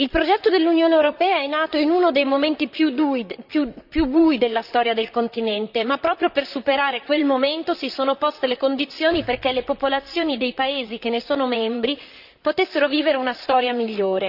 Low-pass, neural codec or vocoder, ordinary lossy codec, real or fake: 5.4 kHz; vocoder, 44.1 kHz, 128 mel bands, Pupu-Vocoder; none; fake